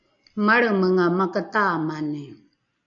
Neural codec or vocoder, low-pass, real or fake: none; 7.2 kHz; real